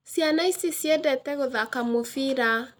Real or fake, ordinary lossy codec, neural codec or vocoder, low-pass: real; none; none; none